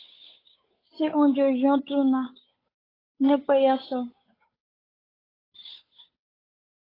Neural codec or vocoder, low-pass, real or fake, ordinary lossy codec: codec, 16 kHz, 8 kbps, FunCodec, trained on Chinese and English, 25 frames a second; 5.4 kHz; fake; AAC, 24 kbps